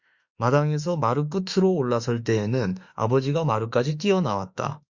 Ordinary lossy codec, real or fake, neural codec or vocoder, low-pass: Opus, 64 kbps; fake; autoencoder, 48 kHz, 32 numbers a frame, DAC-VAE, trained on Japanese speech; 7.2 kHz